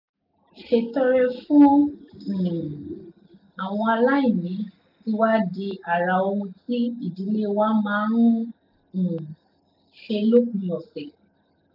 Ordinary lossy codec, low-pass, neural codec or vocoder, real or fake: none; 5.4 kHz; none; real